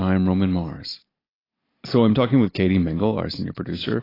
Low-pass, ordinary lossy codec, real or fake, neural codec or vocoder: 5.4 kHz; AAC, 24 kbps; real; none